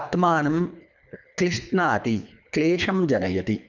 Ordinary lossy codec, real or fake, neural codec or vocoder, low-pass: none; fake; codec, 24 kHz, 3 kbps, HILCodec; 7.2 kHz